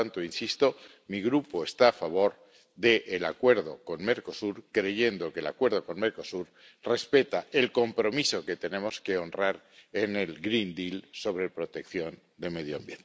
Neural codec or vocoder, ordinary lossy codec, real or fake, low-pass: none; none; real; none